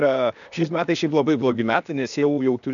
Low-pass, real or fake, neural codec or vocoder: 7.2 kHz; fake; codec, 16 kHz, 0.8 kbps, ZipCodec